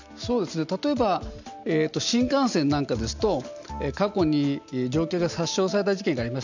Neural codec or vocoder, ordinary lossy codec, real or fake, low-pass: none; none; real; 7.2 kHz